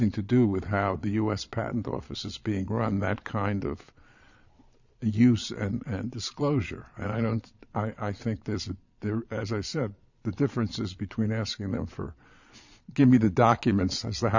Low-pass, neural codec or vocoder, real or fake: 7.2 kHz; vocoder, 22.05 kHz, 80 mel bands, Vocos; fake